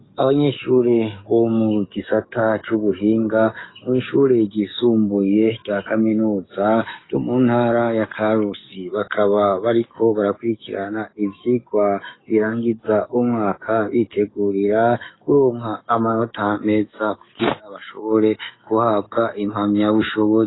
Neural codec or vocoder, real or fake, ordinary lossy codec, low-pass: codec, 44.1 kHz, 7.8 kbps, DAC; fake; AAC, 16 kbps; 7.2 kHz